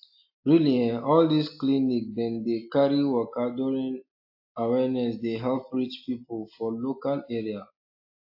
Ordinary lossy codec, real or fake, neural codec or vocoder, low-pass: AAC, 48 kbps; real; none; 5.4 kHz